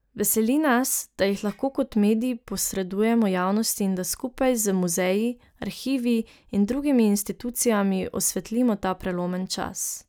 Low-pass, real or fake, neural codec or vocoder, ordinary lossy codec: none; real; none; none